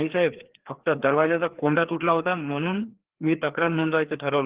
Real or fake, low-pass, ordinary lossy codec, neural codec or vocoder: fake; 3.6 kHz; Opus, 16 kbps; codec, 16 kHz, 2 kbps, FreqCodec, larger model